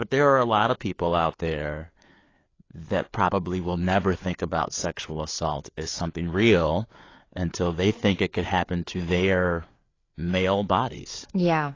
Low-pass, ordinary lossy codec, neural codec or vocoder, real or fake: 7.2 kHz; AAC, 32 kbps; codec, 16 kHz, 4 kbps, FreqCodec, larger model; fake